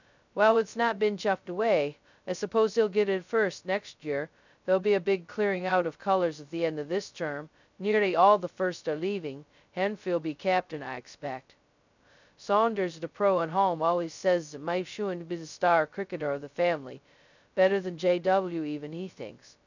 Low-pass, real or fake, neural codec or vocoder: 7.2 kHz; fake; codec, 16 kHz, 0.2 kbps, FocalCodec